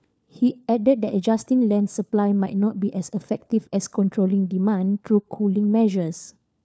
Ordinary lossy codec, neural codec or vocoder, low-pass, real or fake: none; codec, 16 kHz, 4 kbps, FunCodec, trained on LibriTTS, 50 frames a second; none; fake